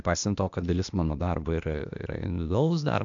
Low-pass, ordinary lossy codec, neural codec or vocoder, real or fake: 7.2 kHz; MP3, 64 kbps; codec, 16 kHz, 0.8 kbps, ZipCodec; fake